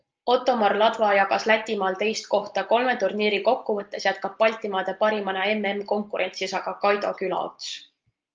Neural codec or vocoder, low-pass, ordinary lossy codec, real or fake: none; 7.2 kHz; Opus, 24 kbps; real